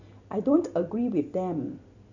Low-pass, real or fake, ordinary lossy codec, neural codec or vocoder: 7.2 kHz; real; none; none